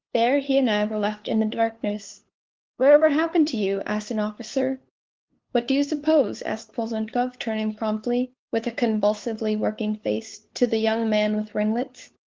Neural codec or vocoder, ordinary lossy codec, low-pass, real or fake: codec, 16 kHz, 2 kbps, FunCodec, trained on LibriTTS, 25 frames a second; Opus, 16 kbps; 7.2 kHz; fake